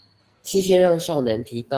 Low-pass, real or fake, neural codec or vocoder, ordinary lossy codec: 14.4 kHz; fake; codec, 44.1 kHz, 2.6 kbps, SNAC; Opus, 24 kbps